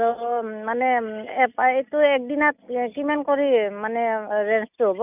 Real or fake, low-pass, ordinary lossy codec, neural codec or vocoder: real; 3.6 kHz; none; none